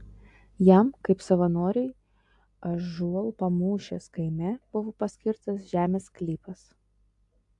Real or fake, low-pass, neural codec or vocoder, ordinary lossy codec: real; 10.8 kHz; none; AAC, 64 kbps